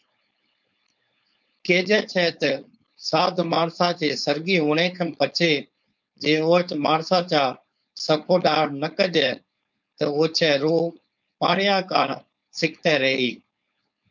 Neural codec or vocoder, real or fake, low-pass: codec, 16 kHz, 4.8 kbps, FACodec; fake; 7.2 kHz